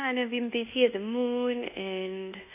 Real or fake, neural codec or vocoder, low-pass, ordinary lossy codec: fake; codec, 24 kHz, 1.2 kbps, DualCodec; 3.6 kHz; none